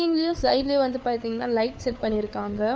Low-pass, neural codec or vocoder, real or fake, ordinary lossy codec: none; codec, 16 kHz, 4 kbps, FunCodec, trained on LibriTTS, 50 frames a second; fake; none